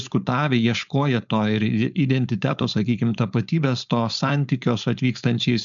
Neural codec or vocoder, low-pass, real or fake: codec, 16 kHz, 4.8 kbps, FACodec; 7.2 kHz; fake